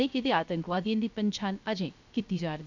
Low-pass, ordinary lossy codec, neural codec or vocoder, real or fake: 7.2 kHz; none; codec, 16 kHz, 0.7 kbps, FocalCodec; fake